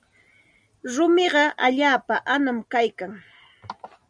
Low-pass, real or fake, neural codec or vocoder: 9.9 kHz; real; none